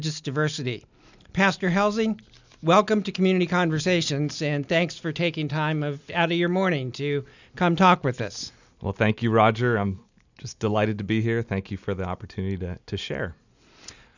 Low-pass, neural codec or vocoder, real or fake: 7.2 kHz; none; real